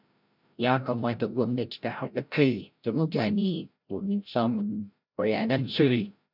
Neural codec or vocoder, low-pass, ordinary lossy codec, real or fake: codec, 16 kHz, 0.5 kbps, FreqCodec, larger model; 5.4 kHz; none; fake